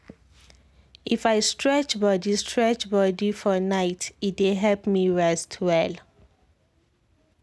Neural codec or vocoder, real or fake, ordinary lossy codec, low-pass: none; real; none; none